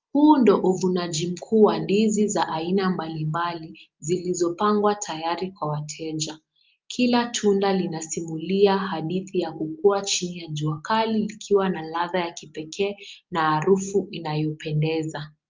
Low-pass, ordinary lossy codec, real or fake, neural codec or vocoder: 7.2 kHz; Opus, 24 kbps; real; none